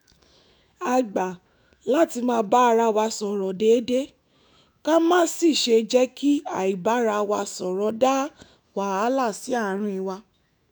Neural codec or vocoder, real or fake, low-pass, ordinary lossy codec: autoencoder, 48 kHz, 128 numbers a frame, DAC-VAE, trained on Japanese speech; fake; none; none